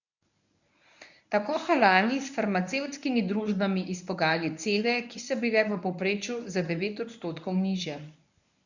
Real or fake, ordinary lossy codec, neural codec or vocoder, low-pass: fake; none; codec, 24 kHz, 0.9 kbps, WavTokenizer, medium speech release version 1; 7.2 kHz